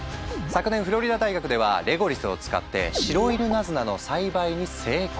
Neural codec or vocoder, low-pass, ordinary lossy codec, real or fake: none; none; none; real